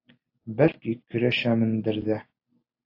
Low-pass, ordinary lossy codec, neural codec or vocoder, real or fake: 5.4 kHz; AAC, 32 kbps; none; real